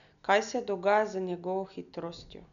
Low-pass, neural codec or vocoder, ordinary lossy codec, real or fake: 7.2 kHz; none; none; real